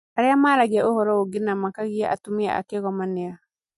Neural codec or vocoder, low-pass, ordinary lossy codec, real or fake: none; 14.4 kHz; MP3, 48 kbps; real